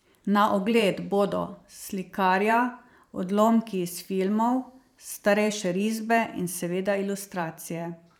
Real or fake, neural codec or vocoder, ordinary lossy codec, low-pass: fake; vocoder, 44.1 kHz, 128 mel bands every 512 samples, BigVGAN v2; none; 19.8 kHz